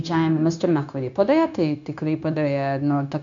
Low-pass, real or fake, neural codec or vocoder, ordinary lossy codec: 7.2 kHz; fake; codec, 16 kHz, 0.9 kbps, LongCat-Audio-Codec; MP3, 64 kbps